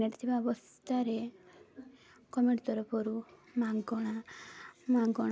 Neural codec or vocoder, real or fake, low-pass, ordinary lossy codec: none; real; none; none